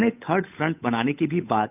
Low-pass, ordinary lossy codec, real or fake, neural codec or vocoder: 3.6 kHz; none; fake; codec, 16 kHz, 8 kbps, FunCodec, trained on Chinese and English, 25 frames a second